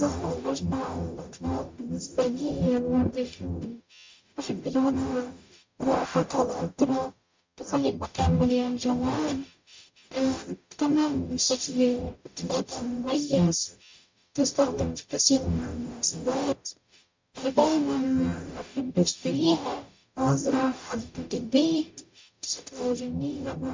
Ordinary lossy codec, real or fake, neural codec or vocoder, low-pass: MP3, 64 kbps; fake; codec, 44.1 kHz, 0.9 kbps, DAC; 7.2 kHz